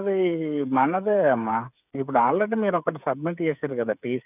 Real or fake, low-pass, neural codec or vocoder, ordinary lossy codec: fake; 3.6 kHz; codec, 16 kHz, 8 kbps, FreqCodec, smaller model; none